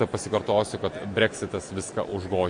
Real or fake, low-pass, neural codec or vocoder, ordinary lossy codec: real; 9.9 kHz; none; MP3, 48 kbps